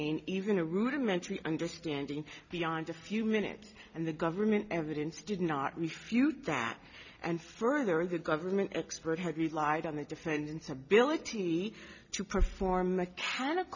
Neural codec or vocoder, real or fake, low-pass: none; real; 7.2 kHz